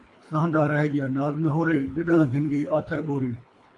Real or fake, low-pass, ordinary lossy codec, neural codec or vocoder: fake; 10.8 kHz; AAC, 48 kbps; codec, 24 kHz, 3 kbps, HILCodec